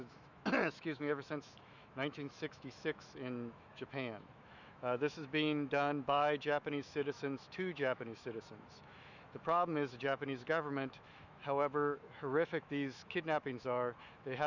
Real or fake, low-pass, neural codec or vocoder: fake; 7.2 kHz; autoencoder, 48 kHz, 128 numbers a frame, DAC-VAE, trained on Japanese speech